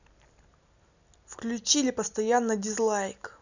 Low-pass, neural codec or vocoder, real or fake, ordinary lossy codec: 7.2 kHz; none; real; none